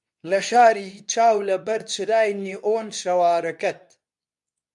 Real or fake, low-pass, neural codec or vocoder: fake; 10.8 kHz; codec, 24 kHz, 0.9 kbps, WavTokenizer, medium speech release version 2